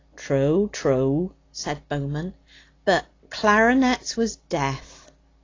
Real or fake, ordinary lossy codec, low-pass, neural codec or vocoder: real; AAC, 32 kbps; 7.2 kHz; none